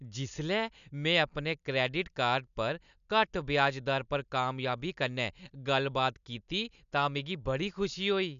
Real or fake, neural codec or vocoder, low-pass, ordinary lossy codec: real; none; 7.2 kHz; none